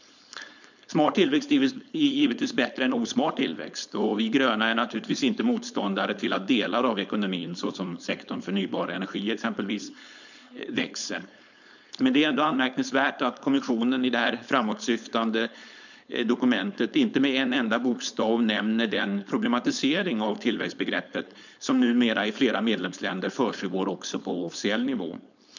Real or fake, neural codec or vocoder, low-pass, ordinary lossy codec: fake; codec, 16 kHz, 4.8 kbps, FACodec; 7.2 kHz; none